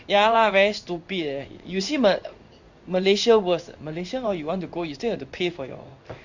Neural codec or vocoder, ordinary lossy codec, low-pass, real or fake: codec, 16 kHz in and 24 kHz out, 1 kbps, XY-Tokenizer; Opus, 64 kbps; 7.2 kHz; fake